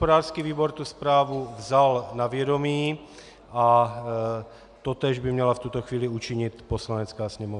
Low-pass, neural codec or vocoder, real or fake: 10.8 kHz; none; real